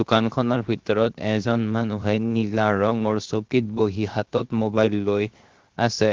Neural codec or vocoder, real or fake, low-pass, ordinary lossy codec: codec, 16 kHz, 0.7 kbps, FocalCodec; fake; 7.2 kHz; Opus, 16 kbps